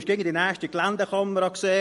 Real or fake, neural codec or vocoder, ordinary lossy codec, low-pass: real; none; MP3, 48 kbps; 14.4 kHz